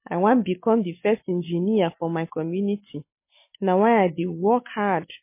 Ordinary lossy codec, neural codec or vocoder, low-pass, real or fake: MP3, 24 kbps; none; 3.6 kHz; real